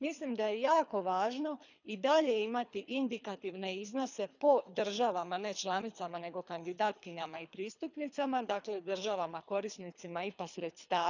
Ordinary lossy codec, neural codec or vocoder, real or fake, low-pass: none; codec, 24 kHz, 3 kbps, HILCodec; fake; 7.2 kHz